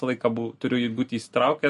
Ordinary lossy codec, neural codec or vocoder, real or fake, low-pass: MP3, 48 kbps; none; real; 14.4 kHz